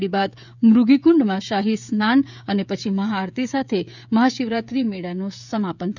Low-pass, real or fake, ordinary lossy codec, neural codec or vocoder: 7.2 kHz; fake; none; codec, 16 kHz, 16 kbps, FreqCodec, smaller model